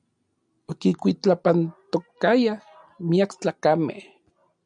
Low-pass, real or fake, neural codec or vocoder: 9.9 kHz; real; none